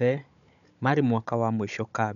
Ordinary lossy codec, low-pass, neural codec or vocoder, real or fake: none; 7.2 kHz; codec, 16 kHz, 16 kbps, FunCodec, trained on Chinese and English, 50 frames a second; fake